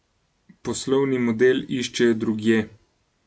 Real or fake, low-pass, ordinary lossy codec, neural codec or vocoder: real; none; none; none